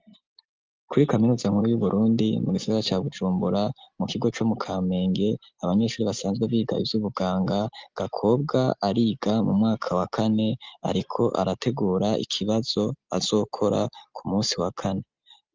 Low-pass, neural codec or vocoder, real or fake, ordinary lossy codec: 7.2 kHz; none; real; Opus, 32 kbps